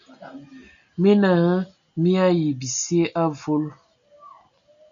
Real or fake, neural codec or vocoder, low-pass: real; none; 7.2 kHz